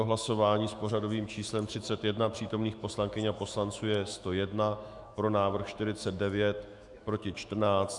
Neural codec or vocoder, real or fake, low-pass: autoencoder, 48 kHz, 128 numbers a frame, DAC-VAE, trained on Japanese speech; fake; 10.8 kHz